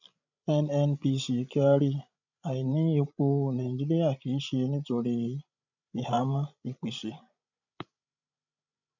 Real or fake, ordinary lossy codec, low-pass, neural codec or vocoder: fake; none; 7.2 kHz; codec, 16 kHz, 16 kbps, FreqCodec, larger model